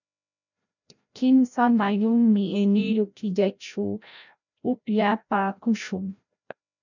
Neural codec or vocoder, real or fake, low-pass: codec, 16 kHz, 0.5 kbps, FreqCodec, larger model; fake; 7.2 kHz